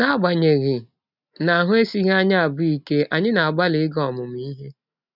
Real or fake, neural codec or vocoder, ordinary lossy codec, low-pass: real; none; none; 5.4 kHz